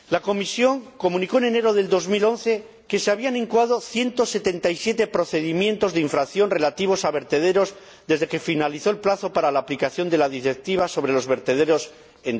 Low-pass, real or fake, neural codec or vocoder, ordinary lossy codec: none; real; none; none